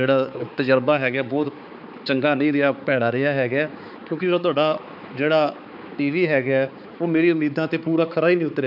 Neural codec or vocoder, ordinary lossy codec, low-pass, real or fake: codec, 16 kHz, 4 kbps, X-Codec, HuBERT features, trained on balanced general audio; none; 5.4 kHz; fake